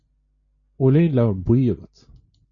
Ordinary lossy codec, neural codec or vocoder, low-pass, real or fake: MP3, 96 kbps; none; 7.2 kHz; real